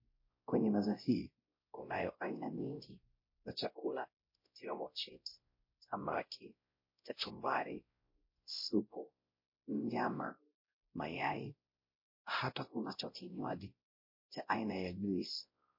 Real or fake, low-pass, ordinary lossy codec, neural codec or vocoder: fake; 5.4 kHz; MP3, 32 kbps; codec, 16 kHz, 0.5 kbps, X-Codec, WavLM features, trained on Multilingual LibriSpeech